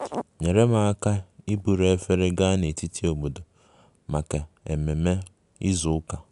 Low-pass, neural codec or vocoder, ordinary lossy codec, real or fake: 10.8 kHz; none; none; real